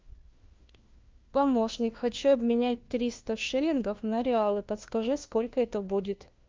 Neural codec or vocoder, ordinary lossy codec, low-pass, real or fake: codec, 16 kHz, 1 kbps, FunCodec, trained on LibriTTS, 50 frames a second; Opus, 24 kbps; 7.2 kHz; fake